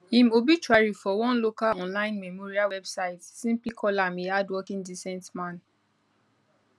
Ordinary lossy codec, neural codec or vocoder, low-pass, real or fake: none; none; none; real